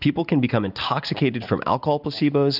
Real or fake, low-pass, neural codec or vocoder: real; 5.4 kHz; none